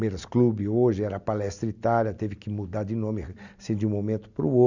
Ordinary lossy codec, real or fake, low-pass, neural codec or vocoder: none; real; 7.2 kHz; none